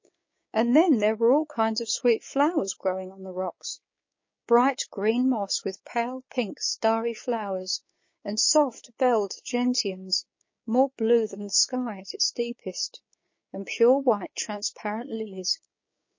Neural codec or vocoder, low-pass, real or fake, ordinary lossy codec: codec, 16 kHz, 6 kbps, DAC; 7.2 kHz; fake; MP3, 32 kbps